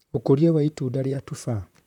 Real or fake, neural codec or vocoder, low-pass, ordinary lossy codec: fake; vocoder, 44.1 kHz, 128 mel bands, Pupu-Vocoder; 19.8 kHz; none